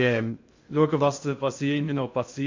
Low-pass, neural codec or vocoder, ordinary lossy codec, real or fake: 7.2 kHz; codec, 16 kHz in and 24 kHz out, 0.6 kbps, FocalCodec, streaming, 2048 codes; MP3, 48 kbps; fake